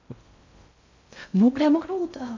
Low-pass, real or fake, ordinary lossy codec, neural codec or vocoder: 7.2 kHz; fake; MP3, 48 kbps; codec, 16 kHz in and 24 kHz out, 0.6 kbps, FocalCodec, streaming, 2048 codes